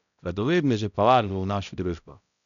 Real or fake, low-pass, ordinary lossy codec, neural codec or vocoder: fake; 7.2 kHz; none; codec, 16 kHz, 0.5 kbps, X-Codec, HuBERT features, trained on balanced general audio